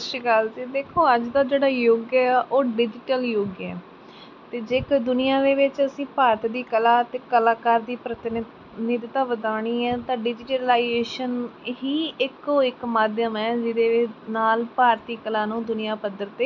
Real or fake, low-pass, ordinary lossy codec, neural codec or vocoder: real; 7.2 kHz; none; none